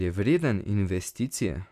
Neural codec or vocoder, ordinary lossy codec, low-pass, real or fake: vocoder, 44.1 kHz, 128 mel bands every 256 samples, BigVGAN v2; none; 14.4 kHz; fake